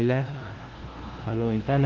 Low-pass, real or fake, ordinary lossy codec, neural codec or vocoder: 7.2 kHz; fake; Opus, 16 kbps; codec, 16 kHz, 0.5 kbps, FunCodec, trained on LibriTTS, 25 frames a second